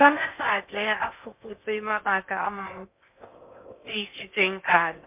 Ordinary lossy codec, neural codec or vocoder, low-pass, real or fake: MP3, 32 kbps; codec, 16 kHz in and 24 kHz out, 0.6 kbps, FocalCodec, streaming, 4096 codes; 3.6 kHz; fake